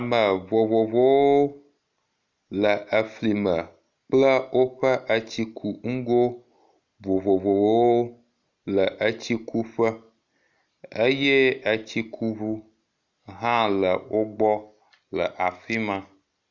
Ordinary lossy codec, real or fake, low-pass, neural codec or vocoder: Opus, 64 kbps; real; 7.2 kHz; none